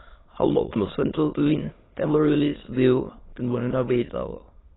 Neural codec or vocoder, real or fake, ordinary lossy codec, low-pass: autoencoder, 22.05 kHz, a latent of 192 numbers a frame, VITS, trained on many speakers; fake; AAC, 16 kbps; 7.2 kHz